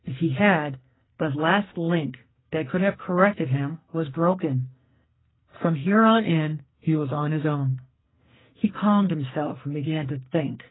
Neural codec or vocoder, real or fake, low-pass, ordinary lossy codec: codec, 44.1 kHz, 2.6 kbps, SNAC; fake; 7.2 kHz; AAC, 16 kbps